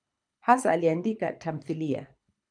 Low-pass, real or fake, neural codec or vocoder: 9.9 kHz; fake; codec, 24 kHz, 6 kbps, HILCodec